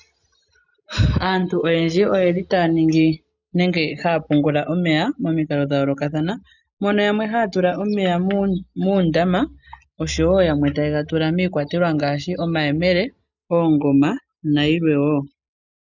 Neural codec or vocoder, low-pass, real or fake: none; 7.2 kHz; real